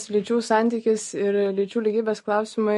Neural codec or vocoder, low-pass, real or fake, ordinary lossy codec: none; 14.4 kHz; real; MP3, 48 kbps